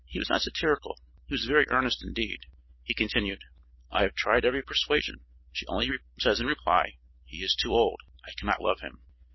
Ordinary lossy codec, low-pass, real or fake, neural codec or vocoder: MP3, 24 kbps; 7.2 kHz; real; none